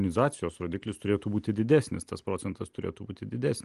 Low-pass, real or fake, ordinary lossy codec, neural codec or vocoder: 10.8 kHz; real; Opus, 24 kbps; none